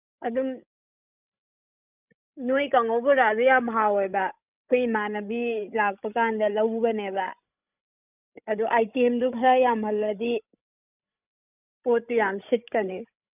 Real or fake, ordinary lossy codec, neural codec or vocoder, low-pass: fake; none; codec, 16 kHz, 16 kbps, FreqCodec, larger model; 3.6 kHz